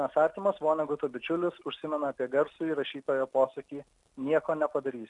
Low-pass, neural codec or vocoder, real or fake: 10.8 kHz; none; real